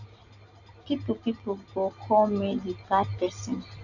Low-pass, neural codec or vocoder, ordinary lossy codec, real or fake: 7.2 kHz; none; none; real